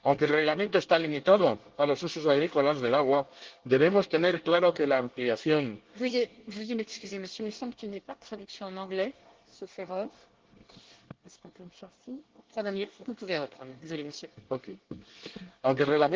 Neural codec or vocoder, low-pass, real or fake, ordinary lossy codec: codec, 24 kHz, 1 kbps, SNAC; 7.2 kHz; fake; Opus, 16 kbps